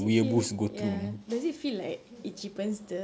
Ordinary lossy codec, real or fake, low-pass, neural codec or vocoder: none; real; none; none